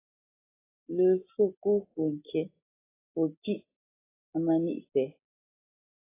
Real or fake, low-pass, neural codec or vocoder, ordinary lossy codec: real; 3.6 kHz; none; AAC, 16 kbps